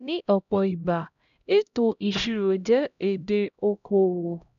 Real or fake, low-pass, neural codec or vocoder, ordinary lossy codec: fake; 7.2 kHz; codec, 16 kHz, 0.5 kbps, X-Codec, HuBERT features, trained on LibriSpeech; none